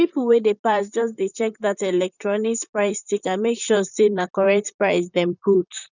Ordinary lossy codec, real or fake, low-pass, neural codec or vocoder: none; fake; 7.2 kHz; vocoder, 44.1 kHz, 128 mel bands, Pupu-Vocoder